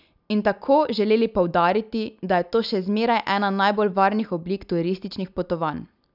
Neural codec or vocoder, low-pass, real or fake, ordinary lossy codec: none; 5.4 kHz; real; none